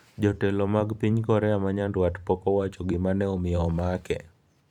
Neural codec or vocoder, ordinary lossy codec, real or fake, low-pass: vocoder, 44.1 kHz, 128 mel bands every 512 samples, BigVGAN v2; none; fake; 19.8 kHz